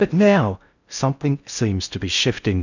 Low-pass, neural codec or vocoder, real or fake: 7.2 kHz; codec, 16 kHz in and 24 kHz out, 0.6 kbps, FocalCodec, streaming, 4096 codes; fake